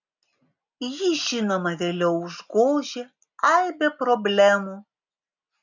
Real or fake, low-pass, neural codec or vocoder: real; 7.2 kHz; none